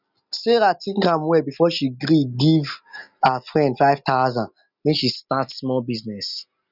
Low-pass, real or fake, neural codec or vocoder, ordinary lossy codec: 5.4 kHz; real; none; none